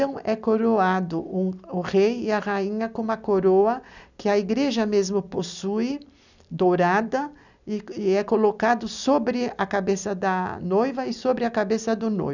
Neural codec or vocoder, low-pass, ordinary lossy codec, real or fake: none; 7.2 kHz; none; real